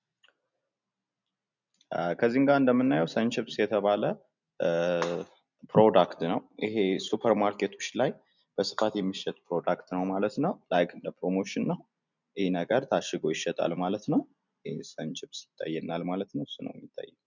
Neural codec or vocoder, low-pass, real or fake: none; 7.2 kHz; real